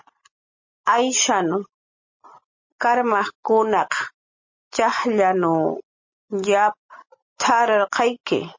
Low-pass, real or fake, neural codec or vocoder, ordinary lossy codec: 7.2 kHz; real; none; MP3, 32 kbps